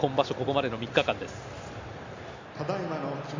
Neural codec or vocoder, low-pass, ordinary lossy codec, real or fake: none; 7.2 kHz; MP3, 64 kbps; real